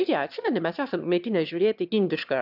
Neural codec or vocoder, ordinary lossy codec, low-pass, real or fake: autoencoder, 22.05 kHz, a latent of 192 numbers a frame, VITS, trained on one speaker; AAC, 48 kbps; 5.4 kHz; fake